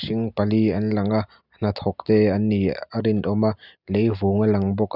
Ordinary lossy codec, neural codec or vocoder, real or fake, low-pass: none; none; real; 5.4 kHz